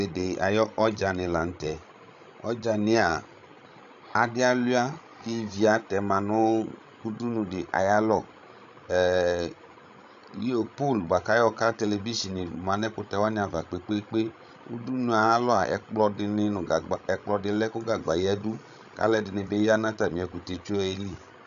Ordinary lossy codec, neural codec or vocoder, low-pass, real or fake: MP3, 96 kbps; codec, 16 kHz, 16 kbps, FreqCodec, larger model; 7.2 kHz; fake